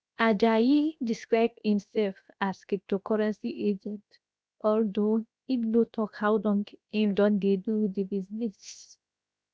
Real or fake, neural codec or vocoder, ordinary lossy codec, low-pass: fake; codec, 16 kHz, 0.7 kbps, FocalCodec; none; none